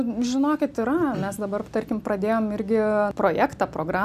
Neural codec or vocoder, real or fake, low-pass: none; real; 14.4 kHz